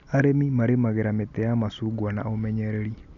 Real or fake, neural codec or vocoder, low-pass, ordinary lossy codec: real; none; 7.2 kHz; none